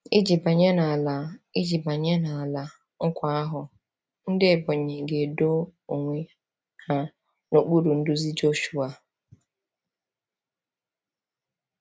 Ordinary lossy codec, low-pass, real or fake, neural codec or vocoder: none; none; real; none